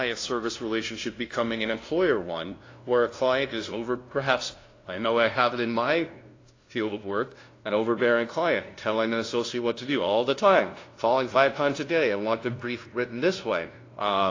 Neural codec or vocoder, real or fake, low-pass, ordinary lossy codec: codec, 16 kHz, 0.5 kbps, FunCodec, trained on LibriTTS, 25 frames a second; fake; 7.2 kHz; AAC, 32 kbps